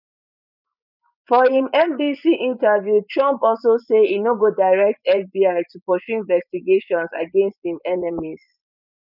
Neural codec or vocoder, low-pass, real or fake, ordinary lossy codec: vocoder, 24 kHz, 100 mel bands, Vocos; 5.4 kHz; fake; none